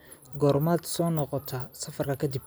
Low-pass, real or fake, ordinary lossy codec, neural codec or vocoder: none; real; none; none